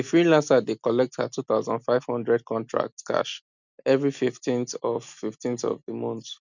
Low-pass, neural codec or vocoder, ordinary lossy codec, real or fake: 7.2 kHz; none; none; real